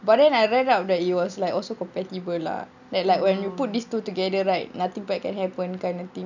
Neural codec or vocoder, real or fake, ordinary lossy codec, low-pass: none; real; none; 7.2 kHz